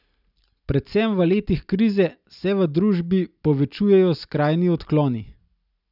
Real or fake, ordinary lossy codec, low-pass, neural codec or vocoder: real; none; 5.4 kHz; none